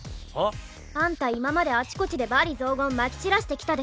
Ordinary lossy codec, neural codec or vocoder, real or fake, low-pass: none; none; real; none